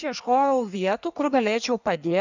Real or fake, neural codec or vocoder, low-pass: fake; codec, 16 kHz in and 24 kHz out, 1.1 kbps, FireRedTTS-2 codec; 7.2 kHz